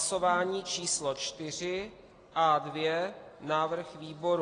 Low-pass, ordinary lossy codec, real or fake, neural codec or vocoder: 9.9 kHz; AAC, 32 kbps; real; none